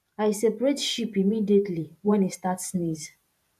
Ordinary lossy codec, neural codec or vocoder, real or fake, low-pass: none; vocoder, 44.1 kHz, 128 mel bands every 512 samples, BigVGAN v2; fake; 14.4 kHz